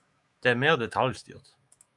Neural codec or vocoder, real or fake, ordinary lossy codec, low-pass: autoencoder, 48 kHz, 128 numbers a frame, DAC-VAE, trained on Japanese speech; fake; MP3, 96 kbps; 10.8 kHz